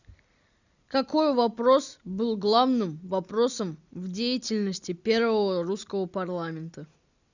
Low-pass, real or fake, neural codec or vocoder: 7.2 kHz; real; none